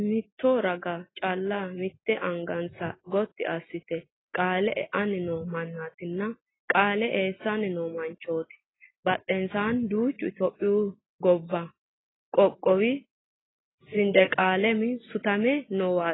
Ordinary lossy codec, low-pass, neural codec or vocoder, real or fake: AAC, 16 kbps; 7.2 kHz; none; real